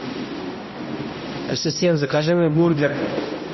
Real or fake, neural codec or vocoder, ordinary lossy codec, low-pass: fake; codec, 16 kHz, 0.5 kbps, X-Codec, HuBERT features, trained on balanced general audio; MP3, 24 kbps; 7.2 kHz